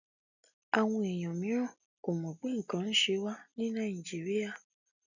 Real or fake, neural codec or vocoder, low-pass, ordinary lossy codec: real; none; 7.2 kHz; none